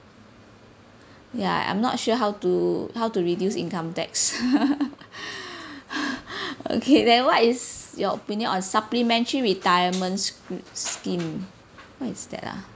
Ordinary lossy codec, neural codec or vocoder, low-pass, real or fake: none; none; none; real